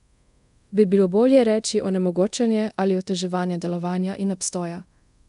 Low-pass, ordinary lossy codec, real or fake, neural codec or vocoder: 10.8 kHz; none; fake; codec, 24 kHz, 0.5 kbps, DualCodec